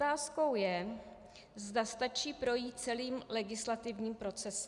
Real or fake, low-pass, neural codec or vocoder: real; 10.8 kHz; none